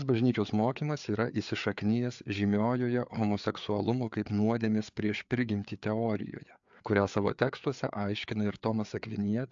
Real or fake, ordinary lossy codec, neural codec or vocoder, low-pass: fake; AAC, 64 kbps; codec, 16 kHz, 4 kbps, FreqCodec, larger model; 7.2 kHz